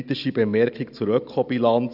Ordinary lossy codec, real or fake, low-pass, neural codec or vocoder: none; real; 5.4 kHz; none